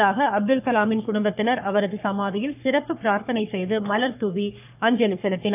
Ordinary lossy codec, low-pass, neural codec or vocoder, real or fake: AAC, 32 kbps; 3.6 kHz; codec, 44.1 kHz, 3.4 kbps, Pupu-Codec; fake